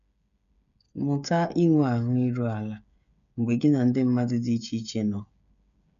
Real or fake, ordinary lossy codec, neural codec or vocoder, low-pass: fake; none; codec, 16 kHz, 8 kbps, FreqCodec, smaller model; 7.2 kHz